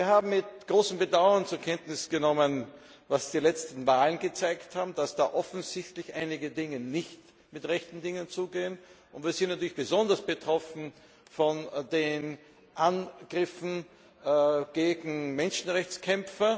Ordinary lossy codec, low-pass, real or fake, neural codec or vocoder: none; none; real; none